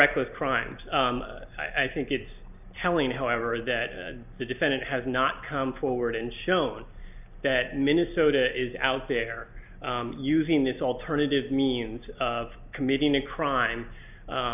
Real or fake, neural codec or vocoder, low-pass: real; none; 3.6 kHz